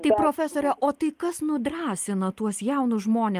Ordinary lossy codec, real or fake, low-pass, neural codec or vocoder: Opus, 32 kbps; real; 14.4 kHz; none